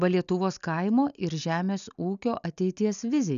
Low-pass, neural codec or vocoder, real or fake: 7.2 kHz; none; real